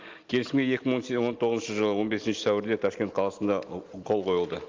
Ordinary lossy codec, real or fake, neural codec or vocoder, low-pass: Opus, 32 kbps; real; none; 7.2 kHz